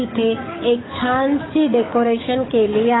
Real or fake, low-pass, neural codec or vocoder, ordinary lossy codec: fake; 7.2 kHz; codec, 44.1 kHz, 7.8 kbps, DAC; AAC, 16 kbps